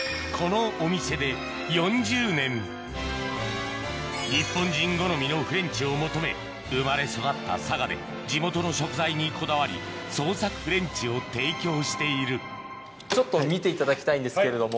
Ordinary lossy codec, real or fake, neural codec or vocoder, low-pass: none; real; none; none